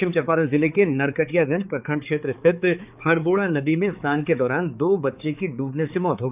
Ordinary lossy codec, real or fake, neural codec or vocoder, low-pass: none; fake; codec, 16 kHz, 4 kbps, X-Codec, HuBERT features, trained on balanced general audio; 3.6 kHz